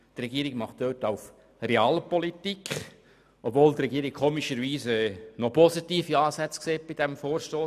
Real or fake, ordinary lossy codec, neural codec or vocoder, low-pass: real; none; none; 14.4 kHz